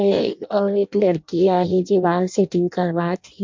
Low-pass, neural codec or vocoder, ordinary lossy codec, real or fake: 7.2 kHz; codec, 16 kHz in and 24 kHz out, 0.6 kbps, FireRedTTS-2 codec; MP3, 48 kbps; fake